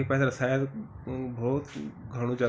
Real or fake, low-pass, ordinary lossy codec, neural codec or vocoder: real; none; none; none